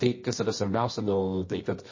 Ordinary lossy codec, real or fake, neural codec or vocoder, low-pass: MP3, 32 kbps; fake; codec, 24 kHz, 0.9 kbps, WavTokenizer, medium music audio release; 7.2 kHz